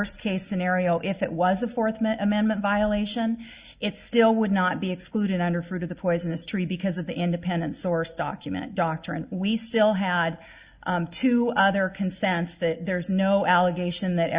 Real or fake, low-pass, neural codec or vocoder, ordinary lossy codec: real; 3.6 kHz; none; Opus, 64 kbps